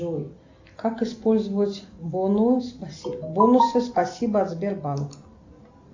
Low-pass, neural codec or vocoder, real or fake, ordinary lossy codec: 7.2 kHz; none; real; AAC, 48 kbps